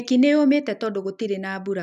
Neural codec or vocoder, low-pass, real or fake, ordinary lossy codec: none; 14.4 kHz; real; none